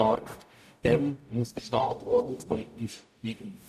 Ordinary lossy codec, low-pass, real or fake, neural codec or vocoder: none; 14.4 kHz; fake; codec, 44.1 kHz, 0.9 kbps, DAC